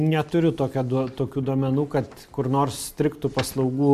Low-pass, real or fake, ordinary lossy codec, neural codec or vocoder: 14.4 kHz; real; MP3, 64 kbps; none